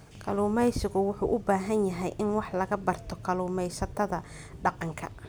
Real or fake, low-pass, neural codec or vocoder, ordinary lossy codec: real; none; none; none